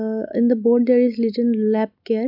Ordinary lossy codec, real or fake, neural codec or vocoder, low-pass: none; real; none; 5.4 kHz